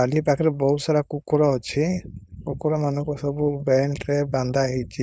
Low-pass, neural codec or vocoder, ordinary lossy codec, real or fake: none; codec, 16 kHz, 4.8 kbps, FACodec; none; fake